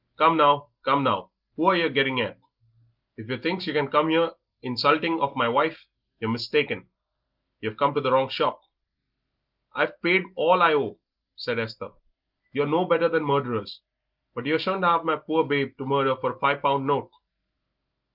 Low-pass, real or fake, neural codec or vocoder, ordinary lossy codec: 5.4 kHz; real; none; Opus, 32 kbps